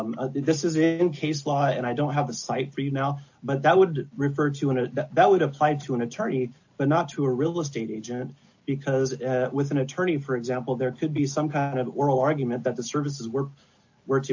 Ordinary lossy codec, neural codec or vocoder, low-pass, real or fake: AAC, 48 kbps; none; 7.2 kHz; real